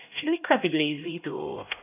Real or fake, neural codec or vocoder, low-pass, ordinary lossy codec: fake; codec, 16 kHz, 1 kbps, X-Codec, HuBERT features, trained on LibriSpeech; 3.6 kHz; none